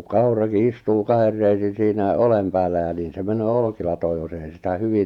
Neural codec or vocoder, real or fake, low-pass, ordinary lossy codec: vocoder, 48 kHz, 128 mel bands, Vocos; fake; 19.8 kHz; none